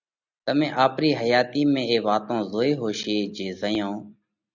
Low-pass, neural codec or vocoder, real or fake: 7.2 kHz; none; real